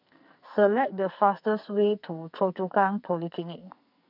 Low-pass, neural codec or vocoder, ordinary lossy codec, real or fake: 5.4 kHz; codec, 44.1 kHz, 2.6 kbps, SNAC; none; fake